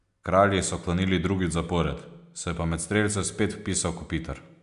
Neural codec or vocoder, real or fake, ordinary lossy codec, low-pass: none; real; MP3, 96 kbps; 10.8 kHz